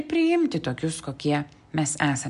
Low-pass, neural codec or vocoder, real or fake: 10.8 kHz; none; real